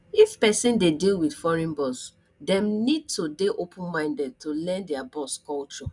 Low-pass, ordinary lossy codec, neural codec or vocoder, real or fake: 10.8 kHz; none; none; real